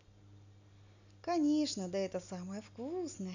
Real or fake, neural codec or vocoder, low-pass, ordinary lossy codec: real; none; 7.2 kHz; none